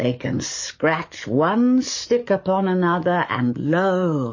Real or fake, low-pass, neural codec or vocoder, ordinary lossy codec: fake; 7.2 kHz; codec, 44.1 kHz, 7.8 kbps, DAC; MP3, 32 kbps